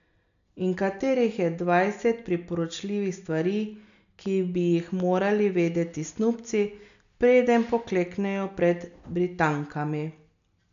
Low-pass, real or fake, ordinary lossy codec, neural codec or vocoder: 7.2 kHz; real; none; none